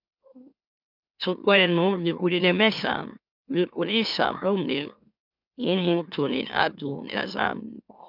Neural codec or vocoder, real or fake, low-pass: autoencoder, 44.1 kHz, a latent of 192 numbers a frame, MeloTTS; fake; 5.4 kHz